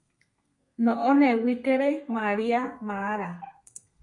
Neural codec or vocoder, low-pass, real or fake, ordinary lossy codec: codec, 32 kHz, 1.9 kbps, SNAC; 10.8 kHz; fake; MP3, 48 kbps